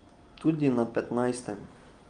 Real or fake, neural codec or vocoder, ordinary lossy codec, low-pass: real; none; Opus, 32 kbps; 9.9 kHz